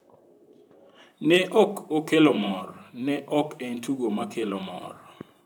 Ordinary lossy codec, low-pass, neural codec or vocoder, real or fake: none; 19.8 kHz; vocoder, 44.1 kHz, 128 mel bands, Pupu-Vocoder; fake